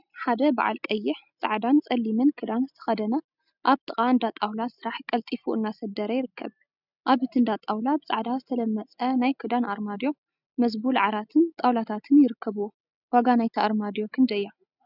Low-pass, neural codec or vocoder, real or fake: 5.4 kHz; none; real